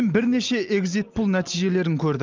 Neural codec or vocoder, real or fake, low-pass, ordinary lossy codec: none; real; 7.2 kHz; Opus, 24 kbps